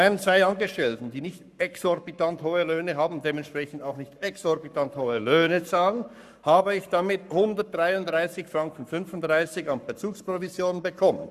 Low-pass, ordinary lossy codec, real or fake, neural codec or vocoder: 14.4 kHz; none; fake; codec, 44.1 kHz, 7.8 kbps, Pupu-Codec